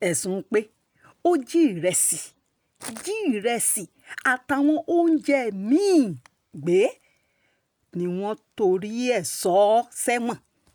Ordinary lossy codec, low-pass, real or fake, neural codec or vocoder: none; none; real; none